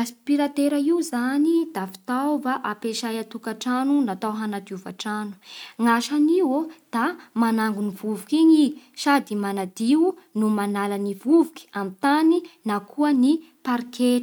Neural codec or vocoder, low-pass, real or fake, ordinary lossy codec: none; none; real; none